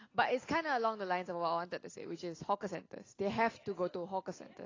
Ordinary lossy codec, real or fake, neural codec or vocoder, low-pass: AAC, 32 kbps; real; none; 7.2 kHz